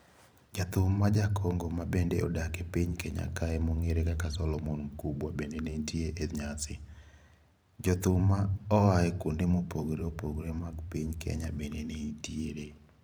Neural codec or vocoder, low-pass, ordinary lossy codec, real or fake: vocoder, 44.1 kHz, 128 mel bands every 512 samples, BigVGAN v2; none; none; fake